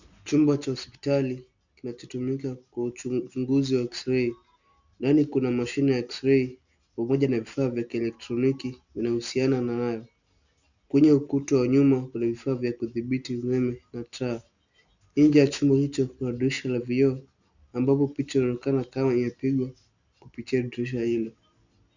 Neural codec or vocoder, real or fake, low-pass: none; real; 7.2 kHz